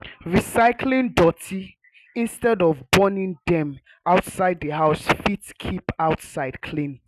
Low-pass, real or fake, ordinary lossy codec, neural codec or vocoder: 14.4 kHz; real; none; none